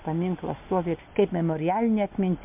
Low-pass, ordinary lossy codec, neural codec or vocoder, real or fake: 3.6 kHz; MP3, 32 kbps; none; real